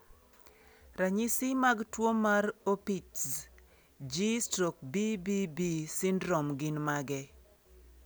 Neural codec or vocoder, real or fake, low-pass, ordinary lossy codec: none; real; none; none